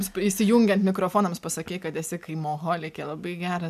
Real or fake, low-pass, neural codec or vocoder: real; 14.4 kHz; none